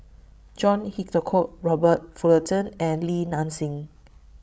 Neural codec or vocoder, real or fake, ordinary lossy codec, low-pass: codec, 16 kHz, 16 kbps, FreqCodec, larger model; fake; none; none